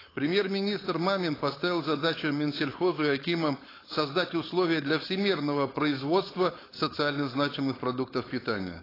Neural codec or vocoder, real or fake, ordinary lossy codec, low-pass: codec, 16 kHz, 4.8 kbps, FACodec; fake; AAC, 24 kbps; 5.4 kHz